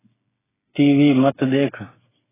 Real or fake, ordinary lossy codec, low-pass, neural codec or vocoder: fake; AAC, 16 kbps; 3.6 kHz; codec, 16 kHz, 8 kbps, FreqCodec, smaller model